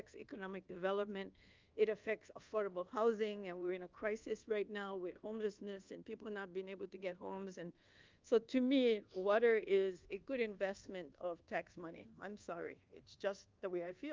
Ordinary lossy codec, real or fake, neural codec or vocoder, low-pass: Opus, 24 kbps; fake; codec, 24 kHz, 1.2 kbps, DualCodec; 7.2 kHz